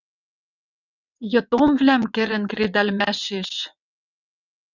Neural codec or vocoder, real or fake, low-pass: vocoder, 22.05 kHz, 80 mel bands, WaveNeXt; fake; 7.2 kHz